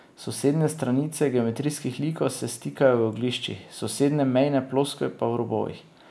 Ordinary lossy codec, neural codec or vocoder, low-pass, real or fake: none; none; none; real